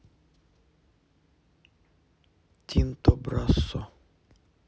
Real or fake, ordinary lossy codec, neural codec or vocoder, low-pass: real; none; none; none